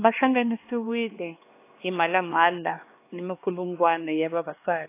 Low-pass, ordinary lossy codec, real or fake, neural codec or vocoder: 3.6 kHz; AAC, 24 kbps; fake; codec, 16 kHz, 2 kbps, X-Codec, HuBERT features, trained on LibriSpeech